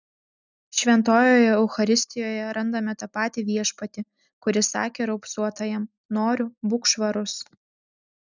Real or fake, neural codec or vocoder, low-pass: real; none; 7.2 kHz